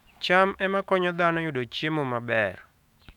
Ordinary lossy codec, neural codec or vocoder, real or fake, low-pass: none; autoencoder, 48 kHz, 128 numbers a frame, DAC-VAE, trained on Japanese speech; fake; 19.8 kHz